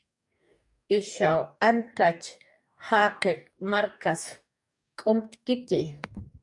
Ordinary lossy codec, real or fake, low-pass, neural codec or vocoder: MP3, 96 kbps; fake; 10.8 kHz; codec, 44.1 kHz, 2.6 kbps, DAC